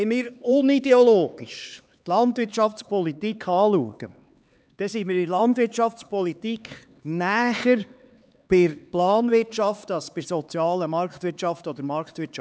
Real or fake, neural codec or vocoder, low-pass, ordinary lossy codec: fake; codec, 16 kHz, 4 kbps, X-Codec, HuBERT features, trained on LibriSpeech; none; none